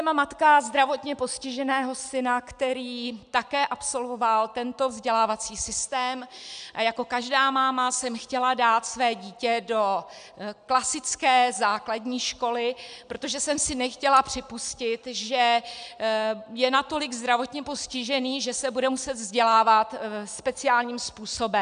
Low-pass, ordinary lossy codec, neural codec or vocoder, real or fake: 9.9 kHz; MP3, 96 kbps; none; real